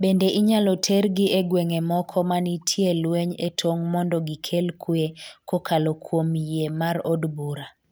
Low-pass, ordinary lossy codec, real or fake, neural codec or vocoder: none; none; real; none